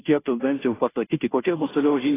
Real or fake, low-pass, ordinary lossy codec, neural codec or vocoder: fake; 3.6 kHz; AAC, 16 kbps; codec, 16 kHz, 0.5 kbps, FunCodec, trained on Chinese and English, 25 frames a second